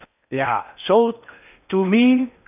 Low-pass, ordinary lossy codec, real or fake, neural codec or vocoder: 3.6 kHz; none; fake; codec, 16 kHz in and 24 kHz out, 0.6 kbps, FocalCodec, streaming, 4096 codes